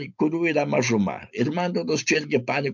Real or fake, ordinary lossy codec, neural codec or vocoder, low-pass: real; MP3, 64 kbps; none; 7.2 kHz